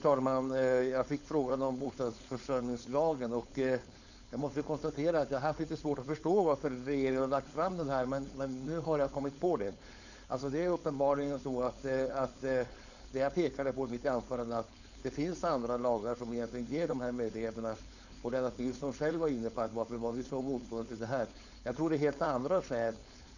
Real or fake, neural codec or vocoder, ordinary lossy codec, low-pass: fake; codec, 16 kHz, 4.8 kbps, FACodec; none; 7.2 kHz